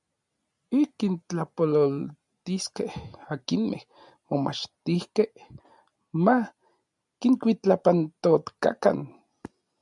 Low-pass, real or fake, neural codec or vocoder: 10.8 kHz; real; none